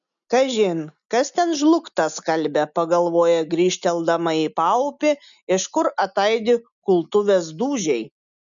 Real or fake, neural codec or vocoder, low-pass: real; none; 7.2 kHz